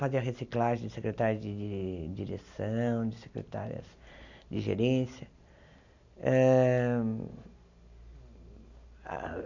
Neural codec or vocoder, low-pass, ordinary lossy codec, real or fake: none; 7.2 kHz; none; real